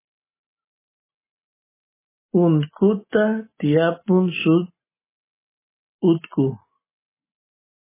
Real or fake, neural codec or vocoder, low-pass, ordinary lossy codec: real; none; 3.6 kHz; MP3, 16 kbps